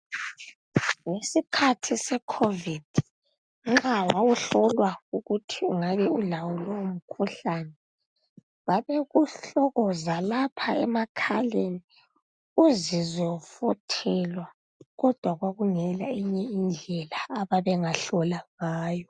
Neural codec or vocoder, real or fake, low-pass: none; real; 9.9 kHz